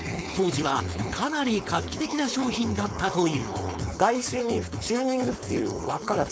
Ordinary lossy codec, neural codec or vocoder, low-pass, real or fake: none; codec, 16 kHz, 4.8 kbps, FACodec; none; fake